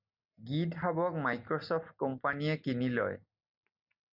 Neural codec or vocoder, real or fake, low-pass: none; real; 5.4 kHz